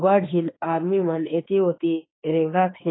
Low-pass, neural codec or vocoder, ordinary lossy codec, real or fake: 7.2 kHz; autoencoder, 48 kHz, 32 numbers a frame, DAC-VAE, trained on Japanese speech; AAC, 16 kbps; fake